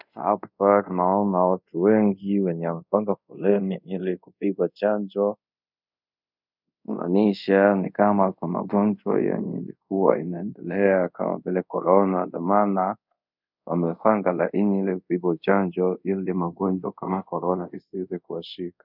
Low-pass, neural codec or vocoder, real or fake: 5.4 kHz; codec, 24 kHz, 0.5 kbps, DualCodec; fake